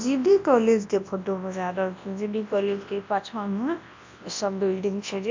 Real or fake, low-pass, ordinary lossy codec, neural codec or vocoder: fake; 7.2 kHz; MP3, 48 kbps; codec, 24 kHz, 0.9 kbps, WavTokenizer, large speech release